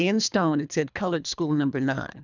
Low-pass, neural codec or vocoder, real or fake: 7.2 kHz; codec, 24 kHz, 3 kbps, HILCodec; fake